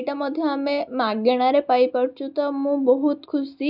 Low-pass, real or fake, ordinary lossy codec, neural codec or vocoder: 5.4 kHz; real; none; none